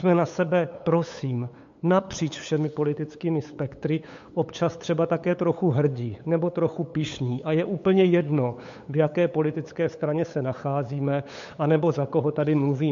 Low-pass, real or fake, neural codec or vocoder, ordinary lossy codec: 7.2 kHz; fake; codec, 16 kHz, 8 kbps, FunCodec, trained on LibriTTS, 25 frames a second; MP3, 48 kbps